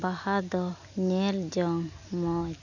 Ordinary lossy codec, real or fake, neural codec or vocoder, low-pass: none; real; none; 7.2 kHz